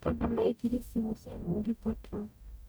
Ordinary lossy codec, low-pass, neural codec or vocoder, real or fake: none; none; codec, 44.1 kHz, 0.9 kbps, DAC; fake